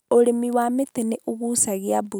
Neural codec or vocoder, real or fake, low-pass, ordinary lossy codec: none; real; none; none